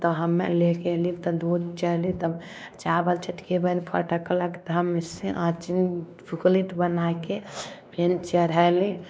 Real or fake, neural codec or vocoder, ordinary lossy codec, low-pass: fake; codec, 16 kHz, 2 kbps, X-Codec, WavLM features, trained on Multilingual LibriSpeech; none; none